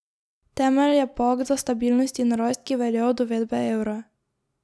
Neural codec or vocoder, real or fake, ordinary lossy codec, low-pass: none; real; none; none